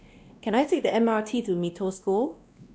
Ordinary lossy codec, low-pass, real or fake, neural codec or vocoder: none; none; fake; codec, 16 kHz, 1 kbps, X-Codec, WavLM features, trained on Multilingual LibriSpeech